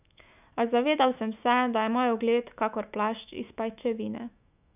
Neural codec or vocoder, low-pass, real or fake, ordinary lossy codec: autoencoder, 48 kHz, 128 numbers a frame, DAC-VAE, trained on Japanese speech; 3.6 kHz; fake; none